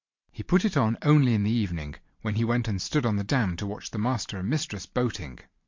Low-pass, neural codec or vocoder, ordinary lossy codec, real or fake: 7.2 kHz; vocoder, 44.1 kHz, 128 mel bands every 512 samples, BigVGAN v2; MP3, 48 kbps; fake